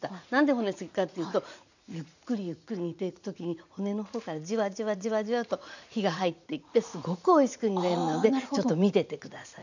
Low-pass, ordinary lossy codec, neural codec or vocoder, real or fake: 7.2 kHz; none; vocoder, 44.1 kHz, 80 mel bands, Vocos; fake